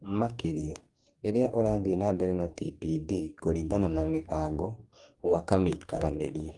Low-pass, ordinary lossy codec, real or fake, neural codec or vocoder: 10.8 kHz; Opus, 32 kbps; fake; codec, 44.1 kHz, 2.6 kbps, DAC